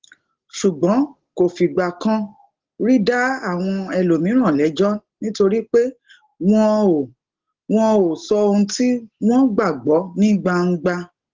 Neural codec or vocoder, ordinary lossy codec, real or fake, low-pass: none; Opus, 16 kbps; real; 7.2 kHz